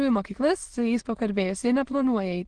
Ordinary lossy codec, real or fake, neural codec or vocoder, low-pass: Opus, 16 kbps; fake; autoencoder, 22.05 kHz, a latent of 192 numbers a frame, VITS, trained on many speakers; 9.9 kHz